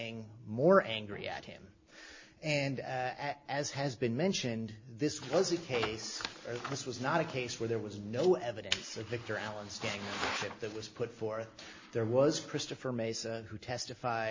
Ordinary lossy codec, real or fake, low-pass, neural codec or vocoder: MP3, 32 kbps; real; 7.2 kHz; none